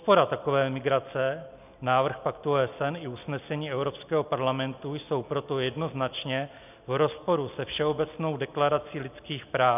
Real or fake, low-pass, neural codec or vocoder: real; 3.6 kHz; none